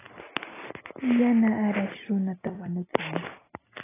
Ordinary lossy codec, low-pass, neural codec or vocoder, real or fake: AAC, 16 kbps; 3.6 kHz; none; real